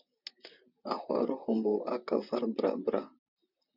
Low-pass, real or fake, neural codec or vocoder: 5.4 kHz; fake; vocoder, 44.1 kHz, 128 mel bands, Pupu-Vocoder